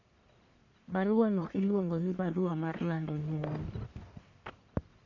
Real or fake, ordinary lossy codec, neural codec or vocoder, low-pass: fake; none; codec, 44.1 kHz, 1.7 kbps, Pupu-Codec; 7.2 kHz